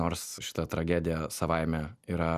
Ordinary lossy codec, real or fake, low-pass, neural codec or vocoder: Opus, 64 kbps; real; 14.4 kHz; none